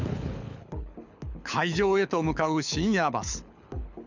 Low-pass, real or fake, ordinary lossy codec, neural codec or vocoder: 7.2 kHz; fake; none; codec, 24 kHz, 6 kbps, HILCodec